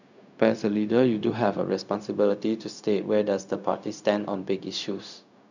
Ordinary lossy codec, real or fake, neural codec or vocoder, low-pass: none; fake; codec, 16 kHz, 0.4 kbps, LongCat-Audio-Codec; 7.2 kHz